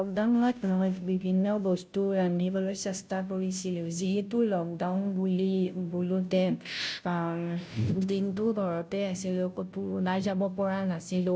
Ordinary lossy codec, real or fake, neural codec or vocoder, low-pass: none; fake; codec, 16 kHz, 0.5 kbps, FunCodec, trained on Chinese and English, 25 frames a second; none